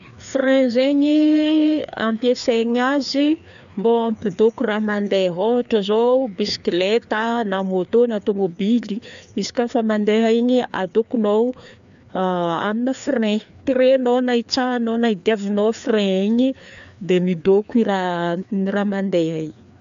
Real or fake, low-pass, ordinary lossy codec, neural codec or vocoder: fake; 7.2 kHz; AAC, 96 kbps; codec, 16 kHz, 2 kbps, FreqCodec, larger model